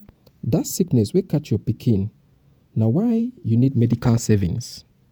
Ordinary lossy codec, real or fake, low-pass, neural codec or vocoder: none; fake; none; vocoder, 48 kHz, 128 mel bands, Vocos